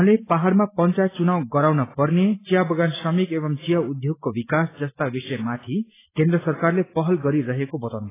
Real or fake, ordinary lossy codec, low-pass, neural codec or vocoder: real; AAC, 16 kbps; 3.6 kHz; none